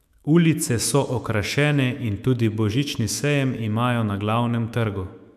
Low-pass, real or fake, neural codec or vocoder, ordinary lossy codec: 14.4 kHz; fake; autoencoder, 48 kHz, 128 numbers a frame, DAC-VAE, trained on Japanese speech; none